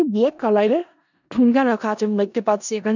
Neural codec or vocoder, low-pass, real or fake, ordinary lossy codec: codec, 16 kHz in and 24 kHz out, 0.4 kbps, LongCat-Audio-Codec, four codebook decoder; 7.2 kHz; fake; none